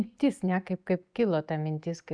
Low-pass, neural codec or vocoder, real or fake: 9.9 kHz; none; real